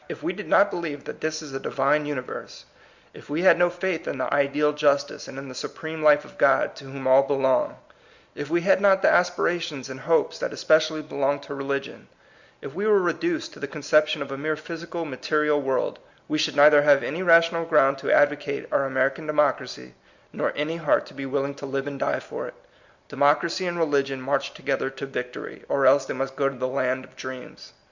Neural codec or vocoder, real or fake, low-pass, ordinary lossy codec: none; real; 7.2 kHz; Opus, 64 kbps